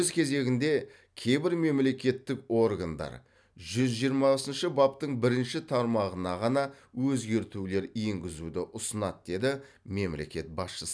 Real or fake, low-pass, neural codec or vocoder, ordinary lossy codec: real; none; none; none